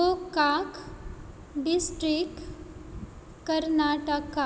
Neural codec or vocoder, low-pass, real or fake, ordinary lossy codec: none; none; real; none